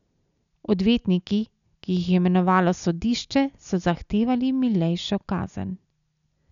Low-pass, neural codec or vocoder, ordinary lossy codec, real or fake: 7.2 kHz; none; none; real